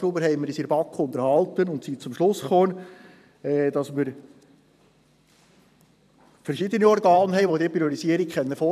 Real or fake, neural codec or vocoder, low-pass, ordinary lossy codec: fake; vocoder, 44.1 kHz, 128 mel bands every 512 samples, BigVGAN v2; 14.4 kHz; none